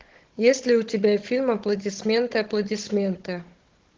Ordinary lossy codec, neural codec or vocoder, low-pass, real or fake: Opus, 16 kbps; codec, 16 kHz, 16 kbps, FunCodec, trained on Chinese and English, 50 frames a second; 7.2 kHz; fake